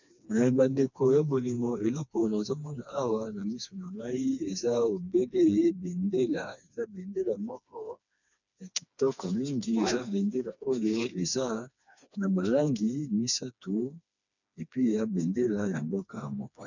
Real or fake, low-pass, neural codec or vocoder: fake; 7.2 kHz; codec, 16 kHz, 2 kbps, FreqCodec, smaller model